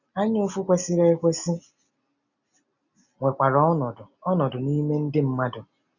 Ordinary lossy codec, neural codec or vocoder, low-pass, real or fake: none; none; 7.2 kHz; real